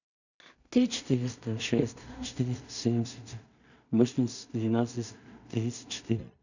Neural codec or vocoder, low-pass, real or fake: codec, 16 kHz in and 24 kHz out, 0.4 kbps, LongCat-Audio-Codec, two codebook decoder; 7.2 kHz; fake